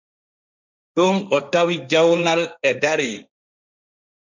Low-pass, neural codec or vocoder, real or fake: 7.2 kHz; codec, 16 kHz, 1.1 kbps, Voila-Tokenizer; fake